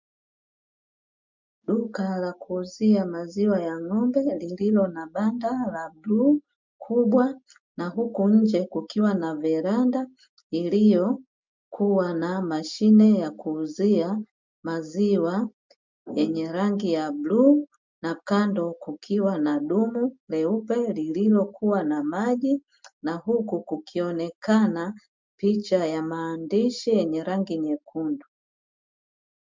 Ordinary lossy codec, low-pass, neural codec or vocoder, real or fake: MP3, 64 kbps; 7.2 kHz; none; real